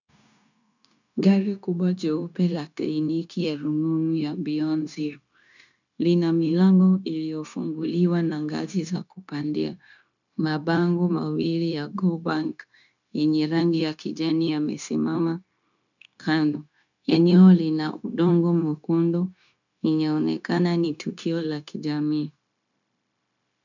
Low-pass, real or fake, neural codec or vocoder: 7.2 kHz; fake; codec, 16 kHz, 0.9 kbps, LongCat-Audio-Codec